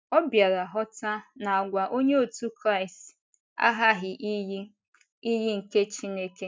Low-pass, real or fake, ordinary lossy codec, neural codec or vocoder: none; real; none; none